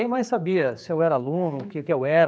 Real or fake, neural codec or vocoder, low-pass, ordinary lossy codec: fake; codec, 16 kHz, 4 kbps, X-Codec, HuBERT features, trained on general audio; none; none